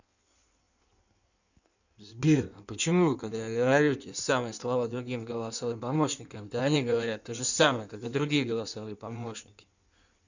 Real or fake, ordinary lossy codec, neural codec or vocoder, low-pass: fake; none; codec, 16 kHz in and 24 kHz out, 1.1 kbps, FireRedTTS-2 codec; 7.2 kHz